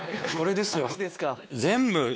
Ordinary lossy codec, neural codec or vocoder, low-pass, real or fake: none; codec, 16 kHz, 2 kbps, X-Codec, WavLM features, trained on Multilingual LibriSpeech; none; fake